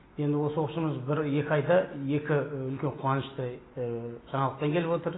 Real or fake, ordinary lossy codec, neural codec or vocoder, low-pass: real; AAC, 16 kbps; none; 7.2 kHz